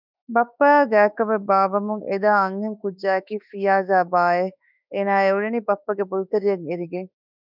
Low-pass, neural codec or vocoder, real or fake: 5.4 kHz; autoencoder, 48 kHz, 32 numbers a frame, DAC-VAE, trained on Japanese speech; fake